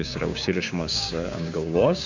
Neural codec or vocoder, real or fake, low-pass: codec, 16 kHz, 6 kbps, DAC; fake; 7.2 kHz